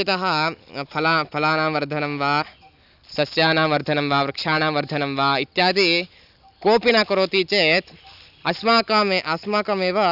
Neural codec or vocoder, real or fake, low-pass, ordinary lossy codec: none; real; 5.4 kHz; none